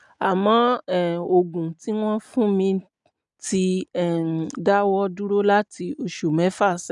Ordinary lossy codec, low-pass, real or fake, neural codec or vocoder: none; 10.8 kHz; real; none